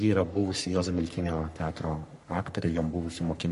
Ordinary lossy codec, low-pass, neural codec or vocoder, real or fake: MP3, 48 kbps; 14.4 kHz; codec, 44.1 kHz, 3.4 kbps, Pupu-Codec; fake